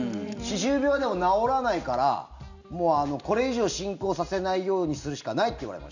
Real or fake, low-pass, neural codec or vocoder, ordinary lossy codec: real; 7.2 kHz; none; none